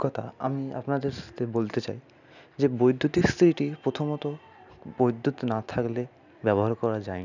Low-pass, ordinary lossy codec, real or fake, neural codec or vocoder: 7.2 kHz; none; real; none